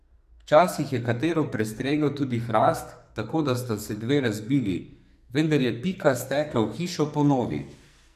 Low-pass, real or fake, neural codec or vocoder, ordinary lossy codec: 14.4 kHz; fake; codec, 44.1 kHz, 2.6 kbps, SNAC; none